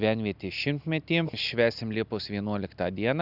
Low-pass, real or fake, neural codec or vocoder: 5.4 kHz; real; none